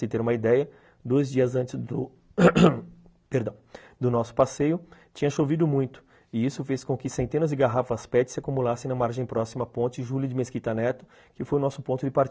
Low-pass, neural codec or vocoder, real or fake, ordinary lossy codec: none; none; real; none